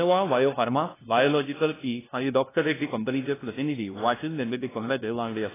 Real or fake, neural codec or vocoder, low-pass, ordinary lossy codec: fake; codec, 16 kHz, 0.5 kbps, FunCodec, trained on LibriTTS, 25 frames a second; 3.6 kHz; AAC, 16 kbps